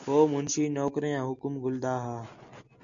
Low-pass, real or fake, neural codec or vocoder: 7.2 kHz; real; none